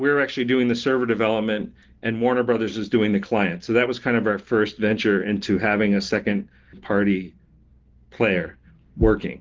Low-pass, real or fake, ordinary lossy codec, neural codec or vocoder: 7.2 kHz; real; Opus, 16 kbps; none